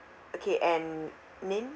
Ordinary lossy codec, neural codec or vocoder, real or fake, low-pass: none; none; real; none